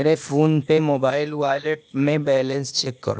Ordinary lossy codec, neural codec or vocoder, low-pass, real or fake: none; codec, 16 kHz, 0.8 kbps, ZipCodec; none; fake